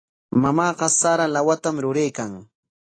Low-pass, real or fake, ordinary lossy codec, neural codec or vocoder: 9.9 kHz; real; AAC, 32 kbps; none